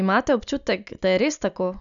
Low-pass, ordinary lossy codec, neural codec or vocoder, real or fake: 7.2 kHz; none; none; real